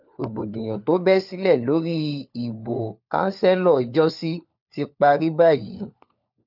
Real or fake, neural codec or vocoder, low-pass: fake; codec, 16 kHz, 4 kbps, FunCodec, trained on LibriTTS, 50 frames a second; 5.4 kHz